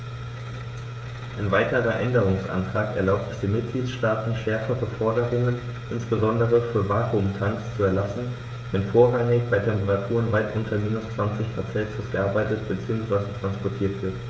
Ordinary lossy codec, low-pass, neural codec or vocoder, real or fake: none; none; codec, 16 kHz, 16 kbps, FreqCodec, smaller model; fake